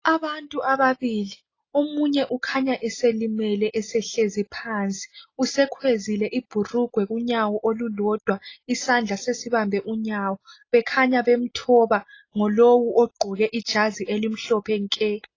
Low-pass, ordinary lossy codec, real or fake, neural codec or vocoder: 7.2 kHz; AAC, 32 kbps; real; none